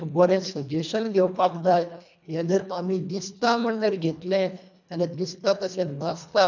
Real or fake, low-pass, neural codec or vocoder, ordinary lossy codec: fake; 7.2 kHz; codec, 24 kHz, 1.5 kbps, HILCodec; none